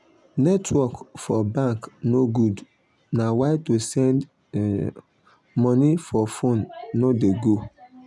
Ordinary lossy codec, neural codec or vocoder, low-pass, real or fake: none; none; none; real